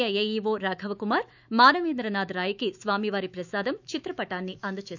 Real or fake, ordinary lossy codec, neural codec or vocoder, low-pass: fake; none; autoencoder, 48 kHz, 128 numbers a frame, DAC-VAE, trained on Japanese speech; 7.2 kHz